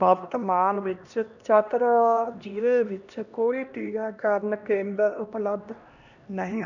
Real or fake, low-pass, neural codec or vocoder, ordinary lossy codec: fake; 7.2 kHz; codec, 16 kHz, 1 kbps, X-Codec, HuBERT features, trained on LibriSpeech; none